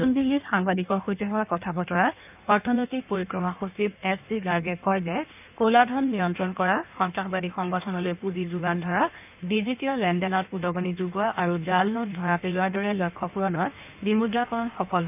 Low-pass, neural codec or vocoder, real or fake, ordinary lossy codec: 3.6 kHz; codec, 16 kHz in and 24 kHz out, 1.1 kbps, FireRedTTS-2 codec; fake; none